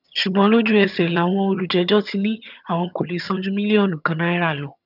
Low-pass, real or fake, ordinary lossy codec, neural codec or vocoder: 5.4 kHz; fake; none; vocoder, 22.05 kHz, 80 mel bands, HiFi-GAN